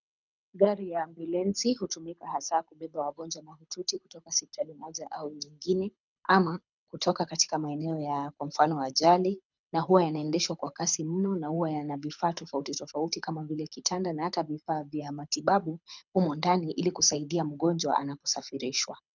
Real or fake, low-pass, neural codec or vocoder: fake; 7.2 kHz; codec, 24 kHz, 6 kbps, HILCodec